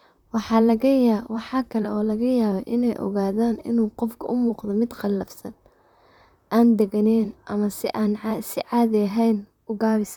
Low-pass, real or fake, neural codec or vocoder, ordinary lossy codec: 19.8 kHz; fake; vocoder, 44.1 kHz, 128 mel bands, Pupu-Vocoder; none